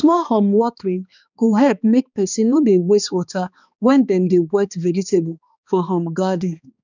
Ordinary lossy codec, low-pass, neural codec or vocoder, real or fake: none; 7.2 kHz; codec, 16 kHz, 2 kbps, X-Codec, HuBERT features, trained on balanced general audio; fake